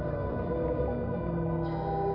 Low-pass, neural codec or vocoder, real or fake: 5.4 kHz; none; real